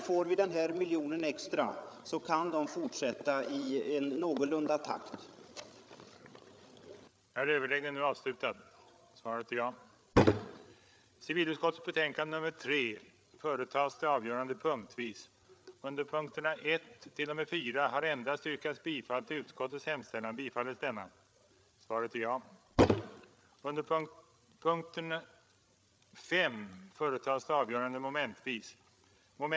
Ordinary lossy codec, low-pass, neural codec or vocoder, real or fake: none; none; codec, 16 kHz, 8 kbps, FreqCodec, larger model; fake